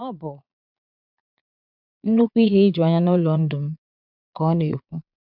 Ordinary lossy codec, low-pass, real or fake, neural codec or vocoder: none; 5.4 kHz; fake; vocoder, 22.05 kHz, 80 mel bands, Vocos